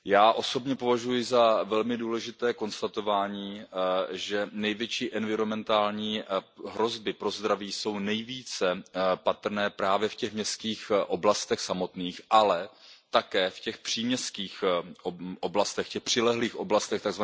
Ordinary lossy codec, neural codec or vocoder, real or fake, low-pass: none; none; real; none